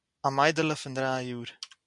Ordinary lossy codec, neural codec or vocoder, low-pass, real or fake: MP3, 96 kbps; none; 10.8 kHz; real